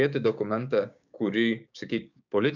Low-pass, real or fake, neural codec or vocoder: 7.2 kHz; fake; codec, 16 kHz, 6 kbps, DAC